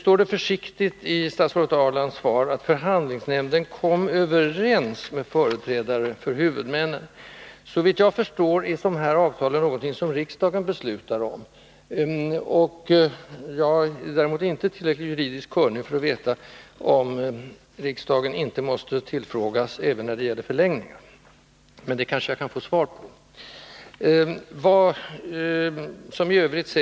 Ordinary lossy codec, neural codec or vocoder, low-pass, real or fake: none; none; none; real